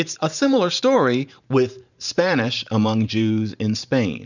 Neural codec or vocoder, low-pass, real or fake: vocoder, 44.1 kHz, 128 mel bands every 512 samples, BigVGAN v2; 7.2 kHz; fake